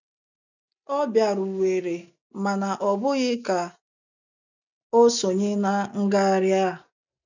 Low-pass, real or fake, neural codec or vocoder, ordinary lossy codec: 7.2 kHz; real; none; none